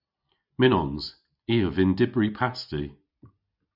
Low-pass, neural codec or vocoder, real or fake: 5.4 kHz; none; real